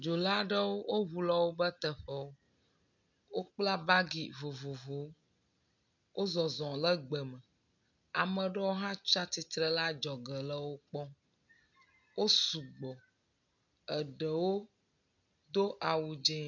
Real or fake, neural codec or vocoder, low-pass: real; none; 7.2 kHz